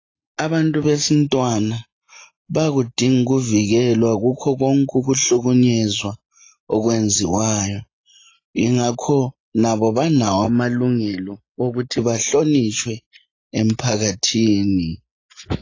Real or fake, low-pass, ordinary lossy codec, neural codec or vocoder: real; 7.2 kHz; AAC, 32 kbps; none